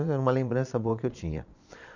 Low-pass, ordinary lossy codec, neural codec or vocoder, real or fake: 7.2 kHz; none; vocoder, 22.05 kHz, 80 mel bands, Vocos; fake